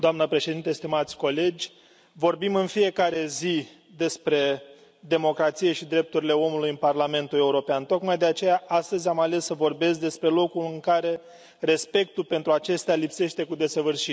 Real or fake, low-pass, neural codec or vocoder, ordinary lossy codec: real; none; none; none